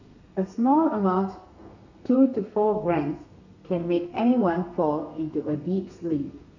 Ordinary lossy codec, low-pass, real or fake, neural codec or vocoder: none; 7.2 kHz; fake; codec, 32 kHz, 1.9 kbps, SNAC